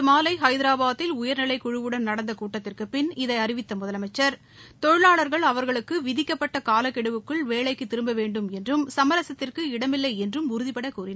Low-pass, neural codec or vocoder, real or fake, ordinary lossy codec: none; none; real; none